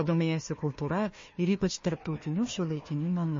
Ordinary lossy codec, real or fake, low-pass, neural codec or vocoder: MP3, 32 kbps; fake; 7.2 kHz; codec, 16 kHz, 1 kbps, FunCodec, trained on Chinese and English, 50 frames a second